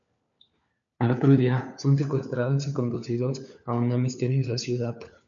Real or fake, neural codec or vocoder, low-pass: fake; codec, 16 kHz, 4 kbps, FunCodec, trained on LibriTTS, 50 frames a second; 7.2 kHz